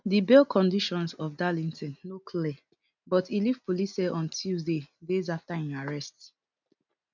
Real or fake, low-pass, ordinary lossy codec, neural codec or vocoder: real; 7.2 kHz; none; none